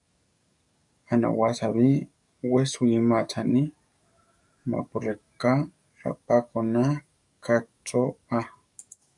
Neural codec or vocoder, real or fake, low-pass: codec, 44.1 kHz, 7.8 kbps, DAC; fake; 10.8 kHz